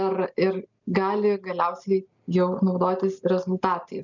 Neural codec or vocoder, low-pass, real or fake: none; 7.2 kHz; real